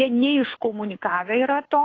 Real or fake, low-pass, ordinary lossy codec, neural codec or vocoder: fake; 7.2 kHz; AAC, 32 kbps; codec, 24 kHz, 6 kbps, HILCodec